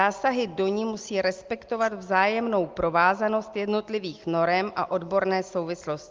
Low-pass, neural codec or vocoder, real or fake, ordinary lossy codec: 7.2 kHz; none; real; Opus, 32 kbps